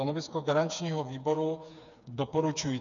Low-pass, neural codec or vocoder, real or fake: 7.2 kHz; codec, 16 kHz, 4 kbps, FreqCodec, smaller model; fake